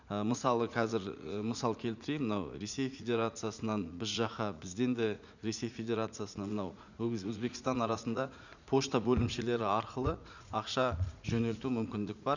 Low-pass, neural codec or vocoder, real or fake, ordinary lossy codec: 7.2 kHz; vocoder, 44.1 kHz, 80 mel bands, Vocos; fake; none